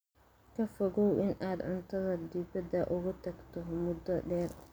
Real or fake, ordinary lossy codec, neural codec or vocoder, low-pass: real; none; none; none